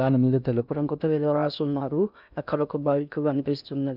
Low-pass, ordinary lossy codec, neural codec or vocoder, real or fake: 5.4 kHz; none; codec, 16 kHz in and 24 kHz out, 0.6 kbps, FocalCodec, streaming, 2048 codes; fake